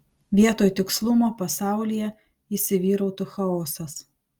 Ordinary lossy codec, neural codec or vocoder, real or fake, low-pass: Opus, 32 kbps; none; real; 19.8 kHz